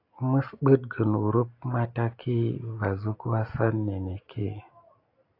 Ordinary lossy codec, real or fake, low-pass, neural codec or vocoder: AAC, 32 kbps; real; 5.4 kHz; none